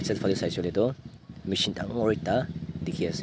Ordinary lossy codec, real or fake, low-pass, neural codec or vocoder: none; real; none; none